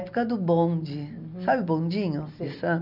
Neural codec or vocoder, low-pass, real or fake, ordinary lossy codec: none; 5.4 kHz; real; none